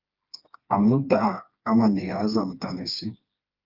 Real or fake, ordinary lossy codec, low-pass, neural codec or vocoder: fake; Opus, 24 kbps; 5.4 kHz; codec, 16 kHz, 2 kbps, FreqCodec, smaller model